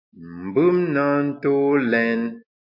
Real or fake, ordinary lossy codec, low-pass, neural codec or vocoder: real; MP3, 32 kbps; 5.4 kHz; none